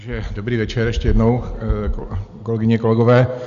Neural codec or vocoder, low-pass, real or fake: none; 7.2 kHz; real